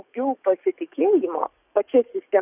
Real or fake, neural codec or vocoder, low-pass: fake; codec, 44.1 kHz, 7.8 kbps, DAC; 3.6 kHz